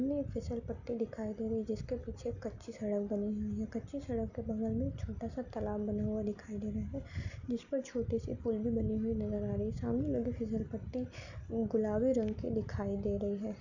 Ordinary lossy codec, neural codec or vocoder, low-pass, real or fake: none; none; 7.2 kHz; real